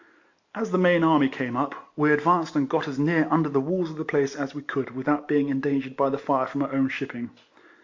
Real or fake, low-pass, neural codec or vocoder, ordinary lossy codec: real; 7.2 kHz; none; AAC, 48 kbps